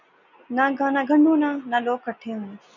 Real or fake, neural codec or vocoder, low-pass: real; none; 7.2 kHz